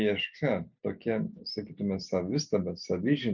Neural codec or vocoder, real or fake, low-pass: none; real; 7.2 kHz